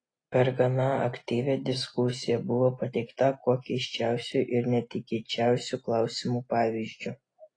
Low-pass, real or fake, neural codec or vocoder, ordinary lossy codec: 9.9 kHz; real; none; AAC, 32 kbps